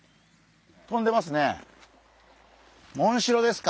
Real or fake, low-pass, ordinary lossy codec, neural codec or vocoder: real; none; none; none